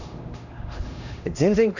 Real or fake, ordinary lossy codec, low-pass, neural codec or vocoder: fake; none; 7.2 kHz; codec, 16 kHz, 1 kbps, X-Codec, HuBERT features, trained on LibriSpeech